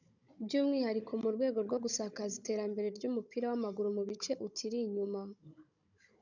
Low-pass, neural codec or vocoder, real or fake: 7.2 kHz; codec, 16 kHz, 16 kbps, FunCodec, trained on Chinese and English, 50 frames a second; fake